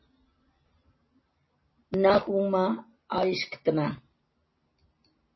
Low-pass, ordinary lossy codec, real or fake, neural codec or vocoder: 7.2 kHz; MP3, 24 kbps; real; none